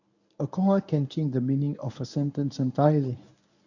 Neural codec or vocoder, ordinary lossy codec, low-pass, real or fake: codec, 24 kHz, 0.9 kbps, WavTokenizer, medium speech release version 1; none; 7.2 kHz; fake